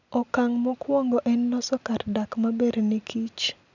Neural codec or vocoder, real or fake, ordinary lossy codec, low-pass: none; real; none; 7.2 kHz